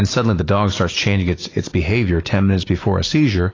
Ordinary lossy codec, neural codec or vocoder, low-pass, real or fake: AAC, 32 kbps; none; 7.2 kHz; real